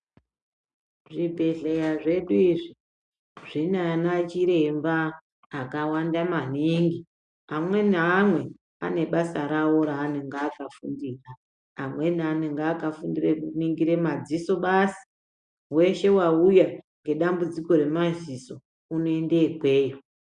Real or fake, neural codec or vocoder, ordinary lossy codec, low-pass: real; none; MP3, 96 kbps; 9.9 kHz